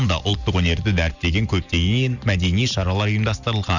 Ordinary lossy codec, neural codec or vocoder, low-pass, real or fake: none; none; 7.2 kHz; real